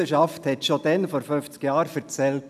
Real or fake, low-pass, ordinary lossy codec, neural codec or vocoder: real; 14.4 kHz; none; none